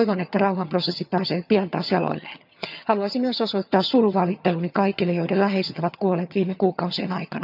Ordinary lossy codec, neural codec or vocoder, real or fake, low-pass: none; vocoder, 22.05 kHz, 80 mel bands, HiFi-GAN; fake; 5.4 kHz